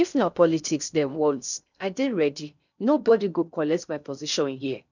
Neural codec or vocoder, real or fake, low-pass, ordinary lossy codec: codec, 16 kHz in and 24 kHz out, 0.6 kbps, FocalCodec, streaming, 2048 codes; fake; 7.2 kHz; none